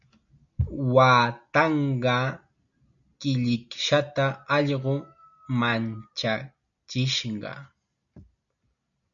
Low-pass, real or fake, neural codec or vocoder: 7.2 kHz; real; none